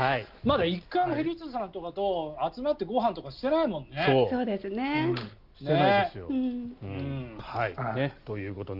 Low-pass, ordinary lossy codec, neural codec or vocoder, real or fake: 5.4 kHz; Opus, 24 kbps; none; real